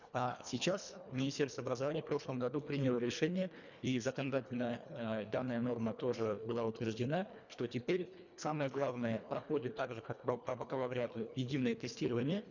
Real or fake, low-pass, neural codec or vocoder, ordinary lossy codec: fake; 7.2 kHz; codec, 24 kHz, 1.5 kbps, HILCodec; none